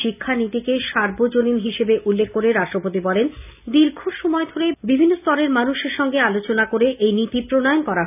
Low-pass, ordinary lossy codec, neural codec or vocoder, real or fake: 3.6 kHz; none; none; real